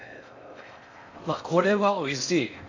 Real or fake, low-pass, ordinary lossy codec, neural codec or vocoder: fake; 7.2 kHz; AAC, 48 kbps; codec, 16 kHz in and 24 kHz out, 0.6 kbps, FocalCodec, streaming, 4096 codes